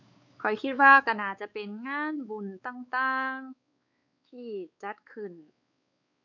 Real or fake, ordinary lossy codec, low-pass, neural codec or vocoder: fake; none; 7.2 kHz; codec, 16 kHz, 4 kbps, X-Codec, WavLM features, trained on Multilingual LibriSpeech